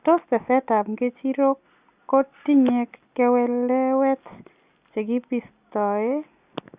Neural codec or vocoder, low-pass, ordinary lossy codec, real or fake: none; 3.6 kHz; Opus, 64 kbps; real